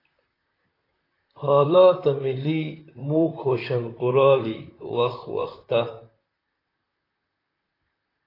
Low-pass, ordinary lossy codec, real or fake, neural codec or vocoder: 5.4 kHz; AAC, 24 kbps; fake; vocoder, 44.1 kHz, 128 mel bands, Pupu-Vocoder